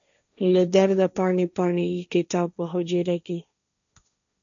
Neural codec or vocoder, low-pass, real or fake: codec, 16 kHz, 1.1 kbps, Voila-Tokenizer; 7.2 kHz; fake